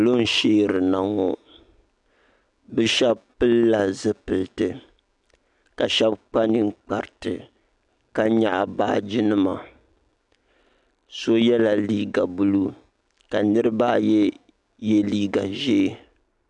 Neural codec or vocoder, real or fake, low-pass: none; real; 10.8 kHz